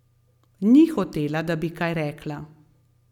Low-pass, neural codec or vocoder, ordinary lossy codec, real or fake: 19.8 kHz; none; none; real